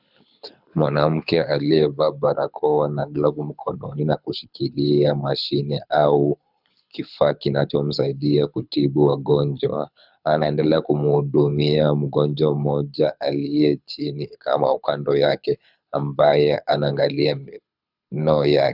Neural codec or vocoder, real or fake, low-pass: codec, 24 kHz, 6 kbps, HILCodec; fake; 5.4 kHz